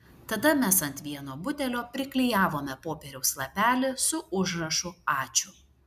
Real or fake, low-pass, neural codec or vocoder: real; 14.4 kHz; none